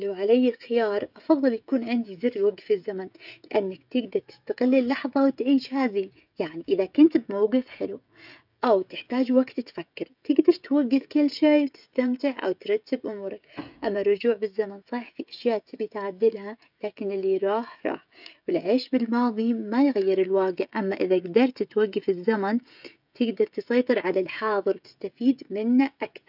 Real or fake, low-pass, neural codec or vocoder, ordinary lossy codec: fake; 5.4 kHz; codec, 16 kHz, 16 kbps, FreqCodec, smaller model; none